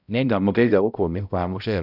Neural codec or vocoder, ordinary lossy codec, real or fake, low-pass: codec, 16 kHz, 0.5 kbps, X-Codec, HuBERT features, trained on balanced general audio; none; fake; 5.4 kHz